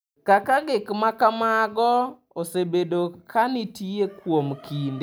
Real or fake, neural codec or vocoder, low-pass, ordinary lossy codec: real; none; none; none